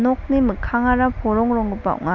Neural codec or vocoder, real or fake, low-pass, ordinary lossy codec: none; real; 7.2 kHz; none